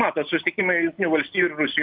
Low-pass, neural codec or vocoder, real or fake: 5.4 kHz; none; real